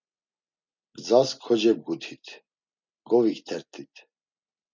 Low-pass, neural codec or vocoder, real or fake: 7.2 kHz; none; real